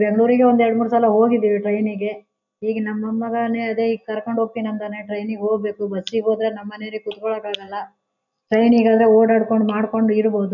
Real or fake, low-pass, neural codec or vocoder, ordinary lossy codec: real; 7.2 kHz; none; none